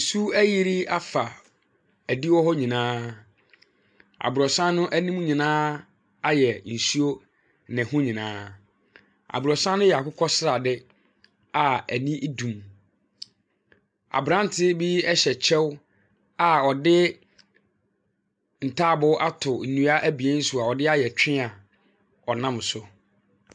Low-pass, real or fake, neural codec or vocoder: 9.9 kHz; real; none